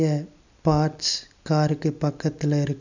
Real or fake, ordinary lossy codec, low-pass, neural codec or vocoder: real; none; 7.2 kHz; none